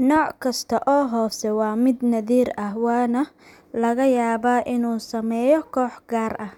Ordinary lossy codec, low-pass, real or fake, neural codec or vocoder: none; 19.8 kHz; real; none